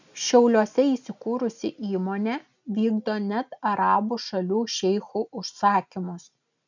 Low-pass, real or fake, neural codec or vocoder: 7.2 kHz; real; none